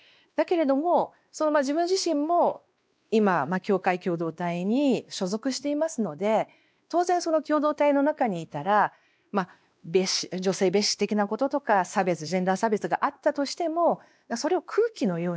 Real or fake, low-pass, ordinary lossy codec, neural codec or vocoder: fake; none; none; codec, 16 kHz, 2 kbps, X-Codec, WavLM features, trained on Multilingual LibriSpeech